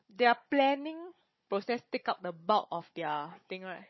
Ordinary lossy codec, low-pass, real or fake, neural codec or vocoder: MP3, 24 kbps; 7.2 kHz; fake; codec, 16 kHz, 16 kbps, FunCodec, trained on Chinese and English, 50 frames a second